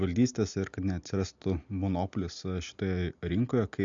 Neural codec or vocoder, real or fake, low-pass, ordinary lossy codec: none; real; 7.2 kHz; MP3, 64 kbps